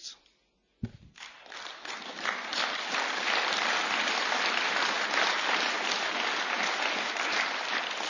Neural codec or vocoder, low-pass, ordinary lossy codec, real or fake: none; 7.2 kHz; none; real